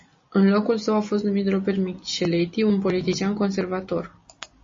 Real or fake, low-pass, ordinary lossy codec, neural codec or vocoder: real; 7.2 kHz; MP3, 32 kbps; none